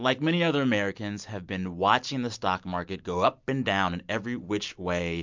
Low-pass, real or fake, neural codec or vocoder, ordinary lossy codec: 7.2 kHz; real; none; MP3, 64 kbps